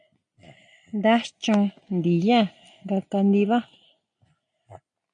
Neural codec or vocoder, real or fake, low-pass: none; real; 9.9 kHz